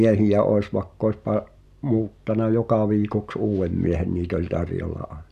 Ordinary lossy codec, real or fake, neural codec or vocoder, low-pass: none; real; none; 14.4 kHz